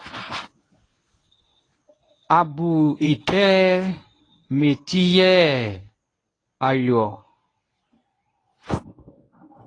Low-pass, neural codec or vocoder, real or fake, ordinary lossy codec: 9.9 kHz; codec, 24 kHz, 0.9 kbps, WavTokenizer, medium speech release version 1; fake; AAC, 32 kbps